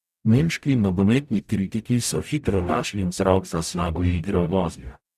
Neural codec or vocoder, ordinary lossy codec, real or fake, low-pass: codec, 44.1 kHz, 0.9 kbps, DAC; none; fake; 14.4 kHz